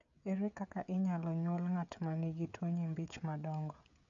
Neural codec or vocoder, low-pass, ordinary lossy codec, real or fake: codec, 16 kHz, 16 kbps, FreqCodec, smaller model; 7.2 kHz; none; fake